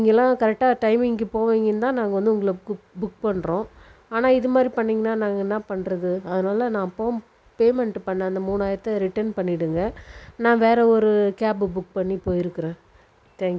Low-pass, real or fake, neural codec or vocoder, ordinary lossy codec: none; real; none; none